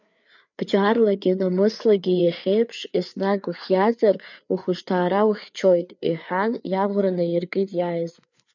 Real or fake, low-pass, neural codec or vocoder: fake; 7.2 kHz; codec, 16 kHz, 4 kbps, FreqCodec, larger model